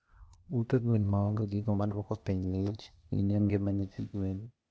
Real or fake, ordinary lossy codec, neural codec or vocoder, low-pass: fake; none; codec, 16 kHz, 0.8 kbps, ZipCodec; none